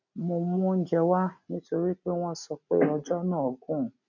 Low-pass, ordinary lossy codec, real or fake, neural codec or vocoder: 7.2 kHz; none; real; none